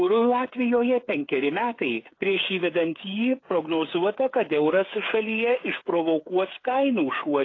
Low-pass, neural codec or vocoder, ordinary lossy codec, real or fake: 7.2 kHz; codec, 16 kHz, 8 kbps, FreqCodec, smaller model; AAC, 32 kbps; fake